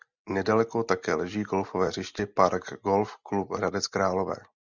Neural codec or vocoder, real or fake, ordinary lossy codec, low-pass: none; real; Opus, 64 kbps; 7.2 kHz